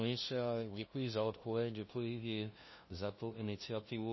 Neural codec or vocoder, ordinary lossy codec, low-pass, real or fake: codec, 16 kHz, 0.5 kbps, FunCodec, trained on LibriTTS, 25 frames a second; MP3, 24 kbps; 7.2 kHz; fake